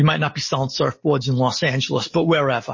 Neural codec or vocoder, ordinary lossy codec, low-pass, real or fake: none; MP3, 32 kbps; 7.2 kHz; real